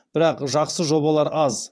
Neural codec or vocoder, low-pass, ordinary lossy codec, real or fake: vocoder, 22.05 kHz, 80 mel bands, WaveNeXt; none; none; fake